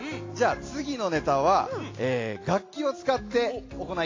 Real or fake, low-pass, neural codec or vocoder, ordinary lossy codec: real; 7.2 kHz; none; AAC, 32 kbps